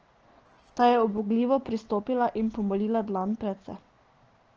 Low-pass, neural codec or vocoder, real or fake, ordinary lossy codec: 7.2 kHz; none; real; Opus, 16 kbps